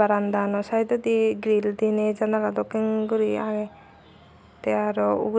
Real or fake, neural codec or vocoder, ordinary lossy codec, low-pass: real; none; none; none